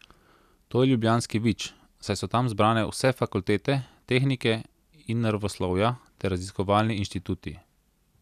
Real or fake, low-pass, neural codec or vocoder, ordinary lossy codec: real; 14.4 kHz; none; none